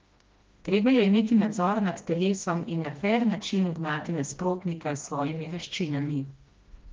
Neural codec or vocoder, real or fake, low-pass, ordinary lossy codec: codec, 16 kHz, 1 kbps, FreqCodec, smaller model; fake; 7.2 kHz; Opus, 32 kbps